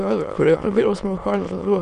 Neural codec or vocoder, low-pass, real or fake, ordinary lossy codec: autoencoder, 22.05 kHz, a latent of 192 numbers a frame, VITS, trained on many speakers; 9.9 kHz; fake; Opus, 64 kbps